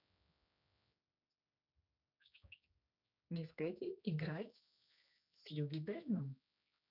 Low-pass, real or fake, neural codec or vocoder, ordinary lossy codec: 5.4 kHz; fake; codec, 16 kHz, 2 kbps, X-Codec, HuBERT features, trained on general audio; none